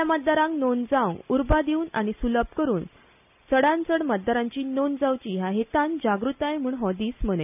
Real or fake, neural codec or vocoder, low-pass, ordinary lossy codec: real; none; 3.6 kHz; none